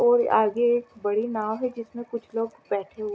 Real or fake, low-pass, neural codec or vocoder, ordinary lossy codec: real; none; none; none